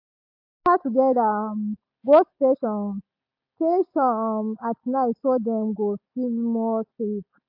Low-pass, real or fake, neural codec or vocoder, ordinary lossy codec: 5.4 kHz; real; none; AAC, 48 kbps